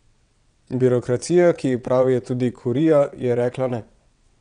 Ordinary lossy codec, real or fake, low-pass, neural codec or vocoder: none; fake; 9.9 kHz; vocoder, 22.05 kHz, 80 mel bands, WaveNeXt